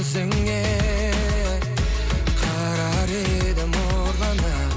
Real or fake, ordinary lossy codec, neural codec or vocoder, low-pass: real; none; none; none